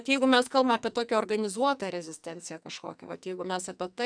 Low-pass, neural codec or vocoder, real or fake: 9.9 kHz; codec, 44.1 kHz, 2.6 kbps, SNAC; fake